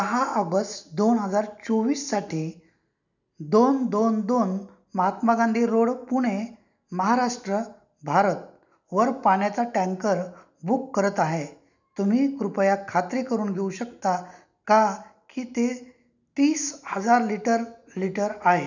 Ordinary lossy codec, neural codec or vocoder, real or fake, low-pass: none; none; real; 7.2 kHz